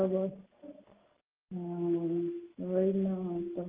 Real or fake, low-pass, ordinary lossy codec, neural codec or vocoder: real; 3.6 kHz; Opus, 32 kbps; none